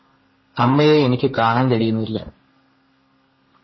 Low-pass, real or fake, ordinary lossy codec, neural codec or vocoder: 7.2 kHz; fake; MP3, 24 kbps; codec, 32 kHz, 1.9 kbps, SNAC